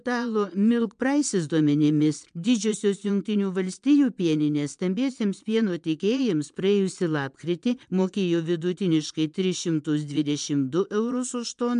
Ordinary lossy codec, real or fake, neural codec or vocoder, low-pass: MP3, 64 kbps; fake; vocoder, 22.05 kHz, 80 mel bands, Vocos; 9.9 kHz